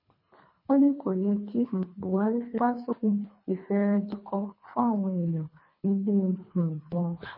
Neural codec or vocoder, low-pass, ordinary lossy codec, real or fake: codec, 24 kHz, 3 kbps, HILCodec; 5.4 kHz; MP3, 24 kbps; fake